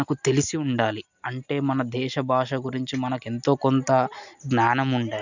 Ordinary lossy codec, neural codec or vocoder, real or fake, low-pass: none; none; real; 7.2 kHz